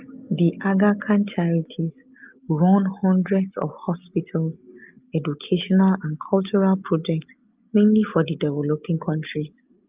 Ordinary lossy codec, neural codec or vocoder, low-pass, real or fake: Opus, 24 kbps; none; 3.6 kHz; real